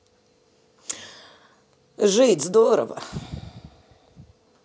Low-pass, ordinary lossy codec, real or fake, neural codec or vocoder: none; none; real; none